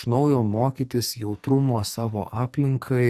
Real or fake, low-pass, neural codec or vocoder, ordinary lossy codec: fake; 14.4 kHz; codec, 44.1 kHz, 2.6 kbps, SNAC; Opus, 64 kbps